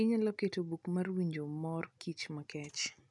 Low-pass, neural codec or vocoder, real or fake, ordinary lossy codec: 10.8 kHz; none; real; none